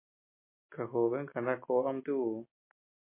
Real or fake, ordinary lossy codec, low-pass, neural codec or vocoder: real; MP3, 24 kbps; 3.6 kHz; none